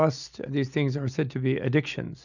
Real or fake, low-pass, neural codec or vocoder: real; 7.2 kHz; none